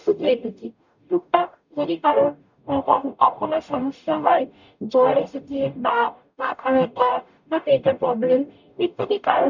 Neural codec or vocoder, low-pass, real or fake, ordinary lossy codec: codec, 44.1 kHz, 0.9 kbps, DAC; 7.2 kHz; fake; none